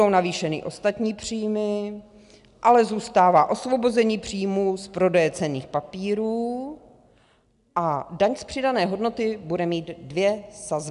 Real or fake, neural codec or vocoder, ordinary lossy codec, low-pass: real; none; AAC, 96 kbps; 10.8 kHz